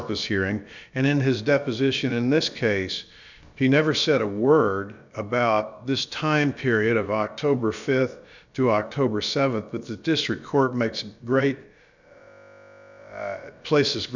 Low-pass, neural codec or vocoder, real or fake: 7.2 kHz; codec, 16 kHz, about 1 kbps, DyCAST, with the encoder's durations; fake